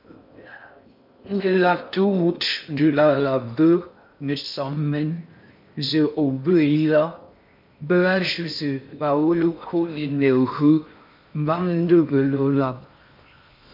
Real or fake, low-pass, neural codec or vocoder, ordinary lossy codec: fake; 5.4 kHz; codec, 16 kHz in and 24 kHz out, 0.6 kbps, FocalCodec, streaming, 4096 codes; MP3, 48 kbps